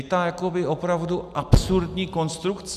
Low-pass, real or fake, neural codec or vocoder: 14.4 kHz; real; none